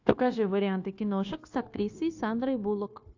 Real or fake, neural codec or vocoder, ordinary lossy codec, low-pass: fake; codec, 16 kHz, 0.9 kbps, LongCat-Audio-Codec; AAC, 48 kbps; 7.2 kHz